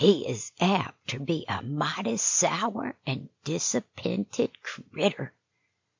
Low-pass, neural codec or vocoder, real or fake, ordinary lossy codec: 7.2 kHz; none; real; MP3, 64 kbps